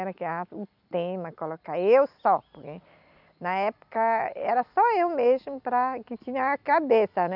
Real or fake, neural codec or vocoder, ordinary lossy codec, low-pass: real; none; none; 5.4 kHz